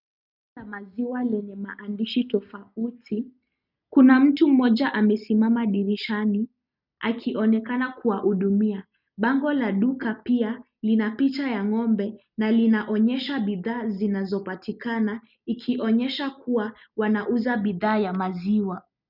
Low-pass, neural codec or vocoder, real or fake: 5.4 kHz; none; real